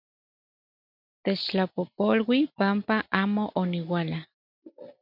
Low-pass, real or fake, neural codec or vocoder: 5.4 kHz; real; none